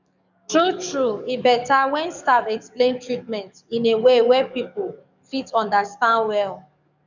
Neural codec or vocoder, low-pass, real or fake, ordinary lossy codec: codec, 44.1 kHz, 7.8 kbps, DAC; 7.2 kHz; fake; none